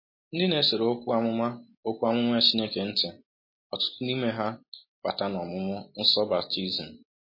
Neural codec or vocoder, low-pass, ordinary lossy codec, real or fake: none; 5.4 kHz; MP3, 24 kbps; real